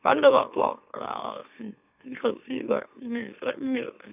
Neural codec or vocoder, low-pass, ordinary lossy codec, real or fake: autoencoder, 44.1 kHz, a latent of 192 numbers a frame, MeloTTS; 3.6 kHz; none; fake